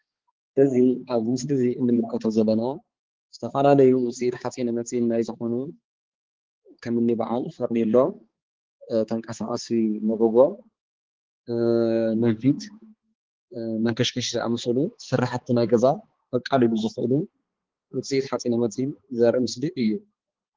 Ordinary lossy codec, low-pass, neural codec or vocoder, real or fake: Opus, 16 kbps; 7.2 kHz; codec, 16 kHz, 4 kbps, X-Codec, HuBERT features, trained on balanced general audio; fake